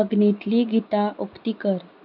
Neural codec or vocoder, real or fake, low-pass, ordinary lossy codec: none; real; 5.4 kHz; none